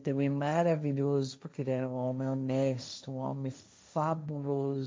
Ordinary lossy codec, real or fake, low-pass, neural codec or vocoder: none; fake; none; codec, 16 kHz, 1.1 kbps, Voila-Tokenizer